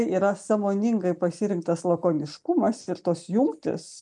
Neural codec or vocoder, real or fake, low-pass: none; real; 10.8 kHz